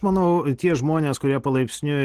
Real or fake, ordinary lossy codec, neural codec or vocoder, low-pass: real; Opus, 16 kbps; none; 14.4 kHz